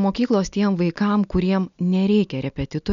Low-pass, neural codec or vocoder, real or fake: 7.2 kHz; none; real